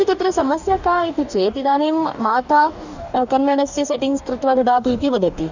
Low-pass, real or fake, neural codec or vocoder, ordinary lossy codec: 7.2 kHz; fake; codec, 44.1 kHz, 2.6 kbps, DAC; none